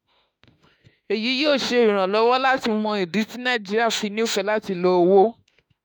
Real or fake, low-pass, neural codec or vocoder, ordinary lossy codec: fake; none; autoencoder, 48 kHz, 32 numbers a frame, DAC-VAE, trained on Japanese speech; none